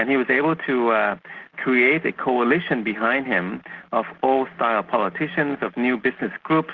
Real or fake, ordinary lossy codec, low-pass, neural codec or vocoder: real; Opus, 16 kbps; 7.2 kHz; none